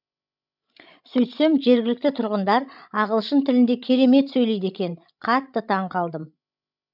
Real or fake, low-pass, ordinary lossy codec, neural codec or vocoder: fake; 5.4 kHz; none; codec, 16 kHz, 16 kbps, FreqCodec, larger model